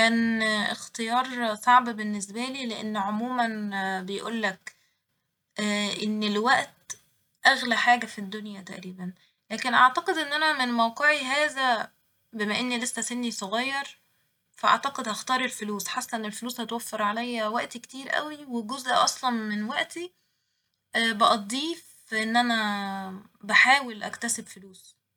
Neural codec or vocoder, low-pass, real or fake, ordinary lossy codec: none; 19.8 kHz; real; none